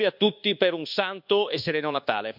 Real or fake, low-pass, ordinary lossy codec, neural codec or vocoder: fake; 5.4 kHz; none; codec, 24 kHz, 1.2 kbps, DualCodec